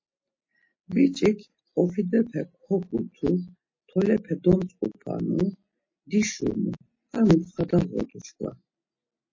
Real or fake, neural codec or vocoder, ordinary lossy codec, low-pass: real; none; MP3, 32 kbps; 7.2 kHz